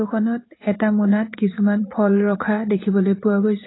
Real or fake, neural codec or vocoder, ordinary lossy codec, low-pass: fake; vocoder, 44.1 kHz, 80 mel bands, Vocos; AAC, 16 kbps; 7.2 kHz